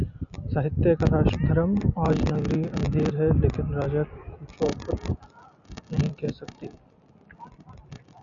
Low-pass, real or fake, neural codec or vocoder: 7.2 kHz; real; none